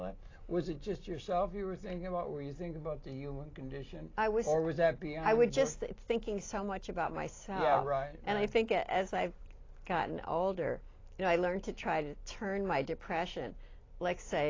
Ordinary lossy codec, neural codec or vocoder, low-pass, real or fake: AAC, 32 kbps; none; 7.2 kHz; real